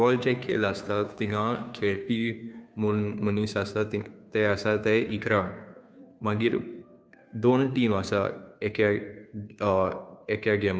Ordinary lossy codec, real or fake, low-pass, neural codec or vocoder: none; fake; none; codec, 16 kHz, 2 kbps, FunCodec, trained on Chinese and English, 25 frames a second